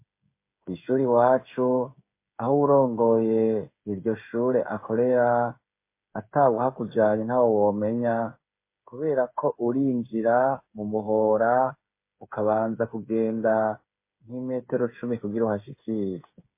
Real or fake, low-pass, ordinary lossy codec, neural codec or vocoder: fake; 3.6 kHz; MP3, 24 kbps; codec, 16 kHz, 8 kbps, FreqCodec, smaller model